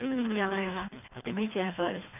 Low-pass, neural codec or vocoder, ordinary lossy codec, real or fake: 3.6 kHz; codec, 24 kHz, 3 kbps, HILCodec; none; fake